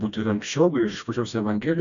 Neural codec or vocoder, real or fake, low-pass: codec, 16 kHz, 1 kbps, FreqCodec, smaller model; fake; 7.2 kHz